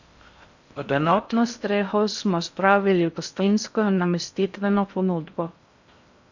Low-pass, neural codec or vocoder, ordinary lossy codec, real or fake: 7.2 kHz; codec, 16 kHz in and 24 kHz out, 0.6 kbps, FocalCodec, streaming, 4096 codes; none; fake